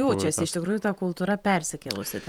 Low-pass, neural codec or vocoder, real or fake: 19.8 kHz; vocoder, 48 kHz, 128 mel bands, Vocos; fake